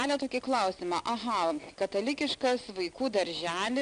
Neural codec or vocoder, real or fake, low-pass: none; real; 9.9 kHz